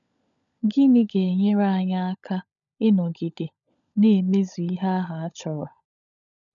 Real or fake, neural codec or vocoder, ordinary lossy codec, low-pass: fake; codec, 16 kHz, 16 kbps, FunCodec, trained on LibriTTS, 50 frames a second; none; 7.2 kHz